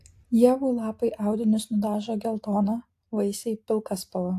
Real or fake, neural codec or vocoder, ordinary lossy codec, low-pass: real; none; AAC, 64 kbps; 14.4 kHz